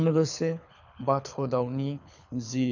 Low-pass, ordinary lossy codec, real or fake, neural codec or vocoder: 7.2 kHz; none; fake; codec, 24 kHz, 6 kbps, HILCodec